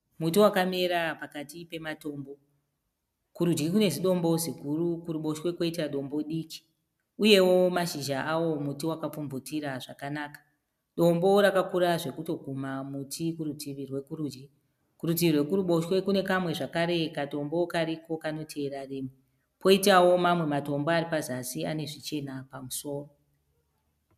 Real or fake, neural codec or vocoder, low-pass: real; none; 14.4 kHz